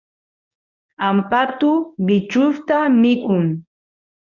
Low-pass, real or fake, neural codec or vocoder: 7.2 kHz; fake; codec, 24 kHz, 0.9 kbps, WavTokenizer, medium speech release version 1